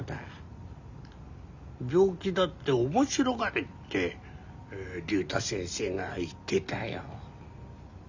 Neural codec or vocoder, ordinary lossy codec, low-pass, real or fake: none; Opus, 64 kbps; 7.2 kHz; real